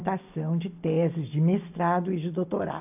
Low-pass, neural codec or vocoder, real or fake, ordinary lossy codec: 3.6 kHz; none; real; AAC, 32 kbps